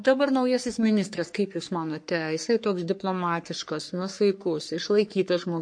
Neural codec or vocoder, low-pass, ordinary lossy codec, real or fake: codec, 44.1 kHz, 3.4 kbps, Pupu-Codec; 9.9 kHz; MP3, 48 kbps; fake